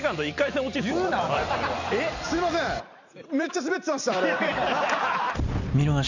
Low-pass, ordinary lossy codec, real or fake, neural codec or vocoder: 7.2 kHz; none; fake; vocoder, 44.1 kHz, 128 mel bands every 256 samples, BigVGAN v2